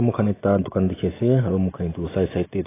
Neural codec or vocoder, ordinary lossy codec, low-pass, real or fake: none; AAC, 16 kbps; 3.6 kHz; real